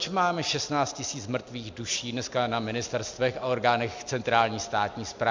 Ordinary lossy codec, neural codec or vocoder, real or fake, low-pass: MP3, 64 kbps; none; real; 7.2 kHz